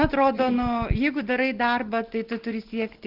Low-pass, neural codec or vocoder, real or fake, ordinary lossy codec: 5.4 kHz; none; real; Opus, 16 kbps